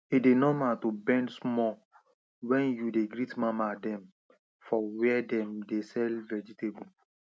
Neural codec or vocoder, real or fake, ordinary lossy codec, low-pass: none; real; none; none